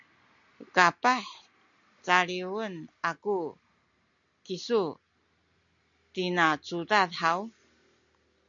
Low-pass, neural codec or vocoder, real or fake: 7.2 kHz; none; real